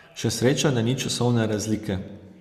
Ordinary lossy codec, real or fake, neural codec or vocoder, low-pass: Opus, 64 kbps; real; none; 14.4 kHz